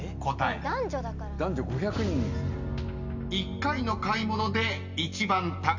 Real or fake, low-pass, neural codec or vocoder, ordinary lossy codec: real; 7.2 kHz; none; none